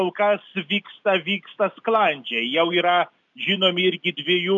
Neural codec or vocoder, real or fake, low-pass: none; real; 7.2 kHz